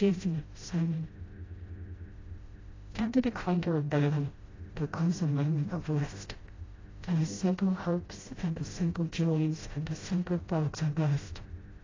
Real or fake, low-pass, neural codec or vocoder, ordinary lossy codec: fake; 7.2 kHz; codec, 16 kHz, 0.5 kbps, FreqCodec, smaller model; AAC, 32 kbps